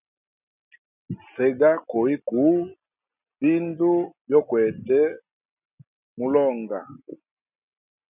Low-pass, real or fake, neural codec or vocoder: 3.6 kHz; real; none